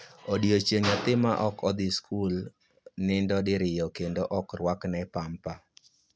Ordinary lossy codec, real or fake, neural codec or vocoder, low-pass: none; real; none; none